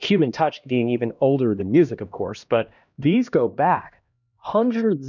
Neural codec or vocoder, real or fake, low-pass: codec, 16 kHz, 1 kbps, X-Codec, HuBERT features, trained on LibriSpeech; fake; 7.2 kHz